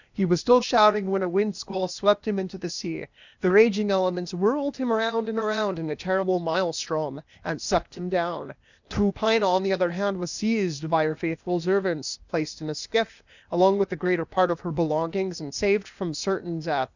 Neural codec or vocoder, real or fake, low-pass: codec, 16 kHz, 0.8 kbps, ZipCodec; fake; 7.2 kHz